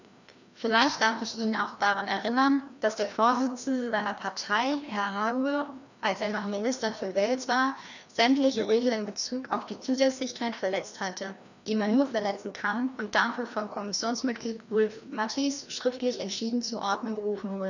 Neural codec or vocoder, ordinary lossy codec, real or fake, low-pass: codec, 16 kHz, 1 kbps, FreqCodec, larger model; none; fake; 7.2 kHz